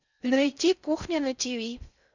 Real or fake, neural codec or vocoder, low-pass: fake; codec, 16 kHz in and 24 kHz out, 0.6 kbps, FocalCodec, streaming, 4096 codes; 7.2 kHz